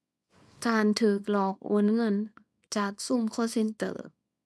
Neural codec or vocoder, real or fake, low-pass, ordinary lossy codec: codec, 24 kHz, 0.9 kbps, WavTokenizer, small release; fake; none; none